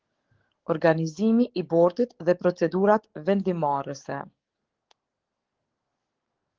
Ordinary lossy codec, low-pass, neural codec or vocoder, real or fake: Opus, 16 kbps; 7.2 kHz; codec, 44.1 kHz, 7.8 kbps, DAC; fake